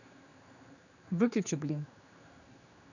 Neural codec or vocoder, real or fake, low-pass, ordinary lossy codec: codec, 16 kHz, 2 kbps, X-Codec, HuBERT features, trained on general audio; fake; 7.2 kHz; none